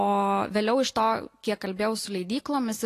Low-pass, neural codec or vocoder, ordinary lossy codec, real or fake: 14.4 kHz; none; AAC, 48 kbps; real